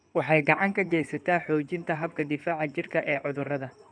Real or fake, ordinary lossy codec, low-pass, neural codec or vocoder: fake; none; 9.9 kHz; codec, 16 kHz in and 24 kHz out, 2.2 kbps, FireRedTTS-2 codec